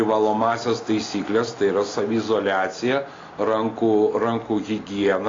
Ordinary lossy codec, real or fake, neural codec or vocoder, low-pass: AAC, 32 kbps; real; none; 7.2 kHz